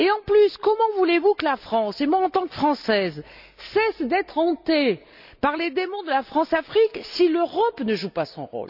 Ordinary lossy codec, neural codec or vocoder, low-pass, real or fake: none; none; 5.4 kHz; real